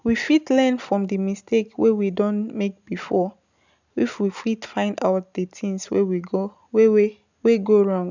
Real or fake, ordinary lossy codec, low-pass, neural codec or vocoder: real; none; 7.2 kHz; none